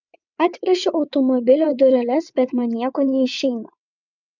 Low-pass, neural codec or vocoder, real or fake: 7.2 kHz; vocoder, 22.05 kHz, 80 mel bands, WaveNeXt; fake